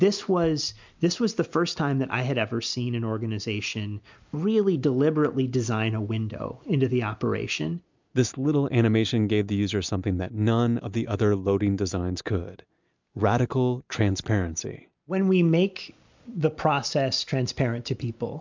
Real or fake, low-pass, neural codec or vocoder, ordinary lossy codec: real; 7.2 kHz; none; MP3, 64 kbps